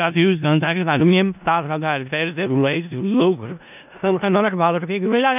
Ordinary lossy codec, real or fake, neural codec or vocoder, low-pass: none; fake; codec, 16 kHz in and 24 kHz out, 0.4 kbps, LongCat-Audio-Codec, four codebook decoder; 3.6 kHz